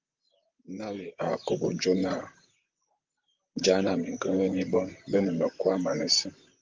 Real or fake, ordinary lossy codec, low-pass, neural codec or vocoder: fake; Opus, 32 kbps; 7.2 kHz; vocoder, 44.1 kHz, 128 mel bands, Pupu-Vocoder